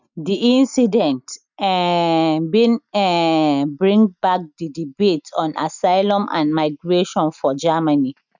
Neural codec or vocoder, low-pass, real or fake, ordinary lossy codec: none; 7.2 kHz; real; none